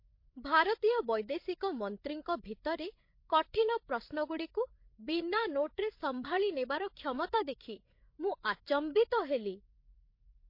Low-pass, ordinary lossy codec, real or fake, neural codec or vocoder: 5.4 kHz; MP3, 32 kbps; fake; codec, 16 kHz, 8 kbps, FreqCodec, larger model